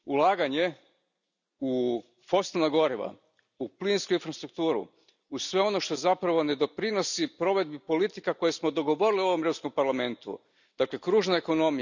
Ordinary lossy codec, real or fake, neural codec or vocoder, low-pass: none; real; none; 7.2 kHz